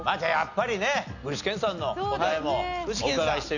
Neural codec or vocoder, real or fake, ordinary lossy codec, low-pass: none; real; none; 7.2 kHz